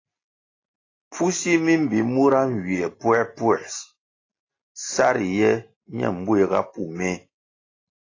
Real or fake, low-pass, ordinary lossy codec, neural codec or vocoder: real; 7.2 kHz; AAC, 32 kbps; none